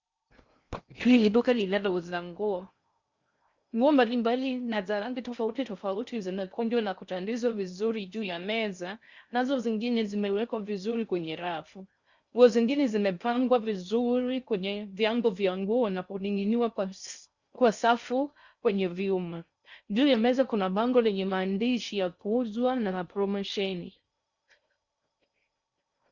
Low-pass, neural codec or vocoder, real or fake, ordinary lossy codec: 7.2 kHz; codec, 16 kHz in and 24 kHz out, 0.6 kbps, FocalCodec, streaming, 2048 codes; fake; Opus, 64 kbps